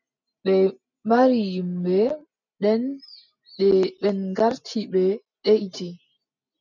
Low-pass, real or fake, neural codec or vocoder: 7.2 kHz; real; none